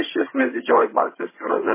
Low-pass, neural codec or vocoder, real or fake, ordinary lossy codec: 3.6 kHz; vocoder, 22.05 kHz, 80 mel bands, HiFi-GAN; fake; MP3, 16 kbps